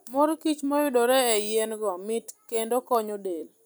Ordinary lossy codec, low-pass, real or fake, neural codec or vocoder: none; none; fake; vocoder, 44.1 kHz, 128 mel bands every 256 samples, BigVGAN v2